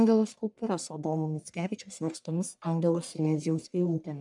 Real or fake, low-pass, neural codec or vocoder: fake; 10.8 kHz; codec, 44.1 kHz, 1.7 kbps, Pupu-Codec